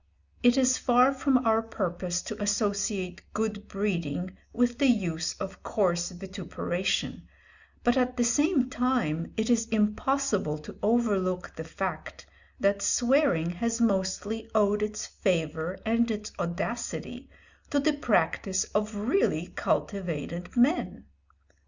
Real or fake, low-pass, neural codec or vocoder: real; 7.2 kHz; none